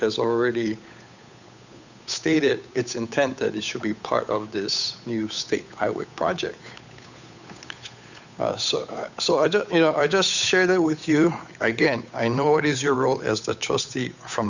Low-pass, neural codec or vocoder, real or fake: 7.2 kHz; codec, 16 kHz, 8 kbps, FunCodec, trained on Chinese and English, 25 frames a second; fake